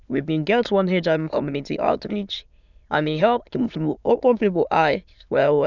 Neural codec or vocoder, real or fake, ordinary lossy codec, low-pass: autoencoder, 22.05 kHz, a latent of 192 numbers a frame, VITS, trained on many speakers; fake; none; 7.2 kHz